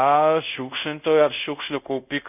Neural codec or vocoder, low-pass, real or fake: codec, 24 kHz, 0.5 kbps, DualCodec; 3.6 kHz; fake